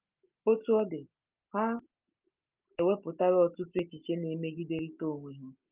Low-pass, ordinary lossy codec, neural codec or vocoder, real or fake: 3.6 kHz; Opus, 32 kbps; none; real